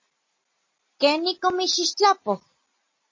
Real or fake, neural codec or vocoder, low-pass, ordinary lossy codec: real; none; 7.2 kHz; MP3, 32 kbps